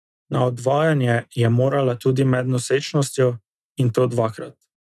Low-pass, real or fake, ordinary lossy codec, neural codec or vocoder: none; real; none; none